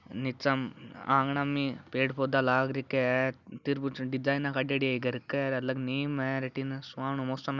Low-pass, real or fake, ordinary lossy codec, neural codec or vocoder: 7.2 kHz; real; none; none